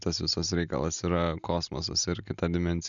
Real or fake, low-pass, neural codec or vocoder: real; 7.2 kHz; none